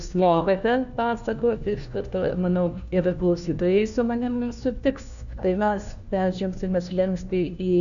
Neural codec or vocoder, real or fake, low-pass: codec, 16 kHz, 1 kbps, FunCodec, trained on LibriTTS, 50 frames a second; fake; 7.2 kHz